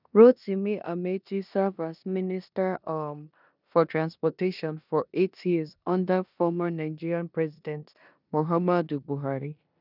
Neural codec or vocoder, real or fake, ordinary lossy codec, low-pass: codec, 16 kHz in and 24 kHz out, 0.9 kbps, LongCat-Audio-Codec, four codebook decoder; fake; none; 5.4 kHz